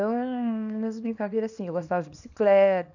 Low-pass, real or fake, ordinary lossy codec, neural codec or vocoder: 7.2 kHz; fake; none; codec, 24 kHz, 0.9 kbps, WavTokenizer, small release